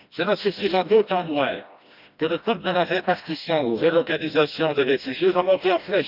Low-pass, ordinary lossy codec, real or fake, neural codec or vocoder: 5.4 kHz; none; fake; codec, 16 kHz, 1 kbps, FreqCodec, smaller model